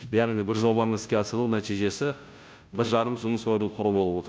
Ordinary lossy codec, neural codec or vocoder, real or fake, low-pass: none; codec, 16 kHz, 0.5 kbps, FunCodec, trained on Chinese and English, 25 frames a second; fake; none